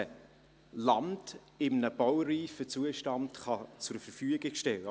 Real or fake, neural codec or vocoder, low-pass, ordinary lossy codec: real; none; none; none